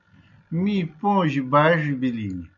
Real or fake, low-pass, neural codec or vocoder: real; 7.2 kHz; none